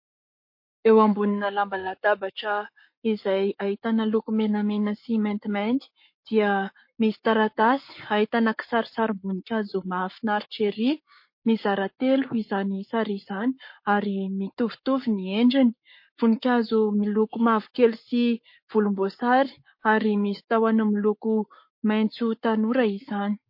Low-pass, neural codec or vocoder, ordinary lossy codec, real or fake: 5.4 kHz; codec, 44.1 kHz, 7.8 kbps, Pupu-Codec; MP3, 32 kbps; fake